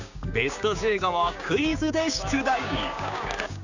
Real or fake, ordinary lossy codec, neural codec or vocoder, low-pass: fake; none; codec, 16 kHz, 6 kbps, DAC; 7.2 kHz